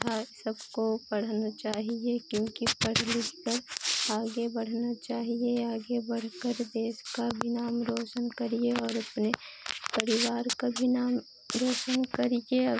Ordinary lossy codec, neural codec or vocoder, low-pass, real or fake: none; none; none; real